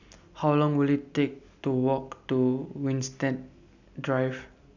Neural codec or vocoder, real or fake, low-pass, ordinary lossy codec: none; real; 7.2 kHz; none